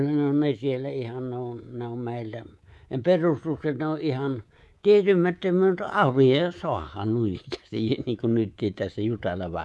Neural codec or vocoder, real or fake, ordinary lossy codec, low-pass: codec, 24 kHz, 3.1 kbps, DualCodec; fake; none; none